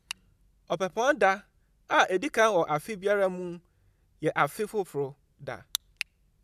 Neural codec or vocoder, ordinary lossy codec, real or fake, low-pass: none; none; real; 14.4 kHz